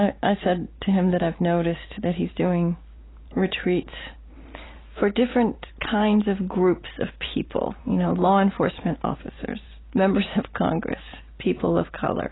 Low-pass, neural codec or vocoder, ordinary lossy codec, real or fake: 7.2 kHz; none; AAC, 16 kbps; real